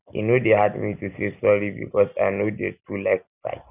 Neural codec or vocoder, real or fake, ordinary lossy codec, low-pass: none; real; none; 3.6 kHz